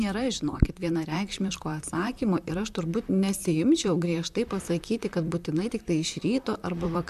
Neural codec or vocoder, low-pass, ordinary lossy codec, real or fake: vocoder, 44.1 kHz, 128 mel bands, Pupu-Vocoder; 14.4 kHz; MP3, 96 kbps; fake